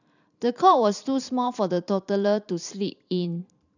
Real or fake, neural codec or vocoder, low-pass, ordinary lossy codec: fake; vocoder, 44.1 kHz, 128 mel bands every 512 samples, BigVGAN v2; 7.2 kHz; none